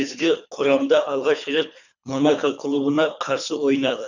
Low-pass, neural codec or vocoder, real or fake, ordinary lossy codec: 7.2 kHz; codec, 24 kHz, 3 kbps, HILCodec; fake; none